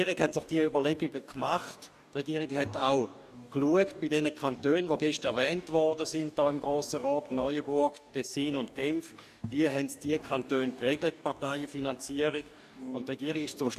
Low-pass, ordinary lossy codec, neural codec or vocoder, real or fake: 14.4 kHz; AAC, 96 kbps; codec, 44.1 kHz, 2.6 kbps, DAC; fake